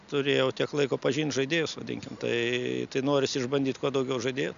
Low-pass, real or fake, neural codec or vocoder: 7.2 kHz; real; none